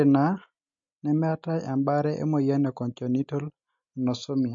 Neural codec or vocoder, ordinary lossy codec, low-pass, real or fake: none; MP3, 48 kbps; 7.2 kHz; real